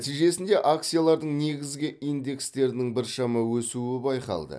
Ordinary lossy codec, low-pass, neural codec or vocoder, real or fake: none; none; none; real